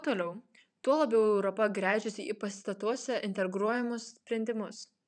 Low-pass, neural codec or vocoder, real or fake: 9.9 kHz; vocoder, 44.1 kHz, 128 mel bands every 512 samples, BigVGAN v2; fake